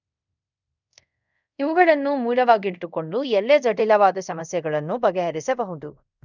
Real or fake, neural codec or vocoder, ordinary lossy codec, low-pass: fake; codec, 24 kHz, 0.5 kbps, DualCodec; none; 7.2 kHz